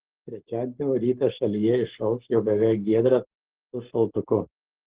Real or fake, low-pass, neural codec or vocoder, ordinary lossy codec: fake; 3.6 kHz; codec, 44.1 kHz, 7.8 kbps, Pupu-Codec; Opus, 16 kbps